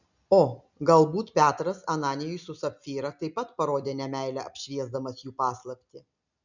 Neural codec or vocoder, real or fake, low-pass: none; real; 7.2 kHz